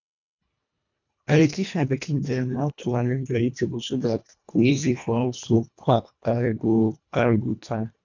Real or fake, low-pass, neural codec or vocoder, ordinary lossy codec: fake; 7.2 kHz; codec, 24 kHz, 1.5 kbps, HILCodec; none